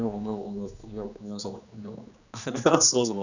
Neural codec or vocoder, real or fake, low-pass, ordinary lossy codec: codec, 16 kHz, 2 kbps, X-Codec, HuBERT features, trained on general audio; fake; 7.2 kHz; none